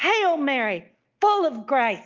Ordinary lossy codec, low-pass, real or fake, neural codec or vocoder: Opus, 24 kbps; 7.2 kHz; real; none